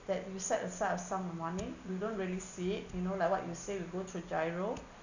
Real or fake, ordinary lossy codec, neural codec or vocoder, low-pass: real; none; none; 7.2 kHz